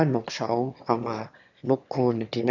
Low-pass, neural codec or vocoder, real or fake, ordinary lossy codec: 7.2 kHz; autoencoder, 22.05 kHz, a latent of 192 numbers a frame, VITS, trained on one speaker; fake; none